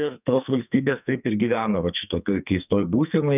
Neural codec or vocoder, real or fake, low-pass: codec, 44.1 kHz, 2.6 kbps, SNAC; fake; 3.6 kHz